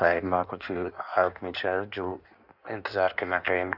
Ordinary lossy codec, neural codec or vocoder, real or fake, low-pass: none; codec, 16 kHz in and 24 kHz out, 1.1 kbps, FireRedTTS-2 codec; fake; 5.4 kHz